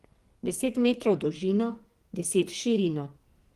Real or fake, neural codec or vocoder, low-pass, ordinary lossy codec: fake; codec, 44.1 kHz, 2.6 kbps, SNAC; 14.4 kHz; Opus, 24 kbps